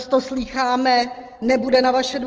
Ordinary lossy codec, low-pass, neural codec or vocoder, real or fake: Opus, 16 kbps; 7.2 kHz; none; real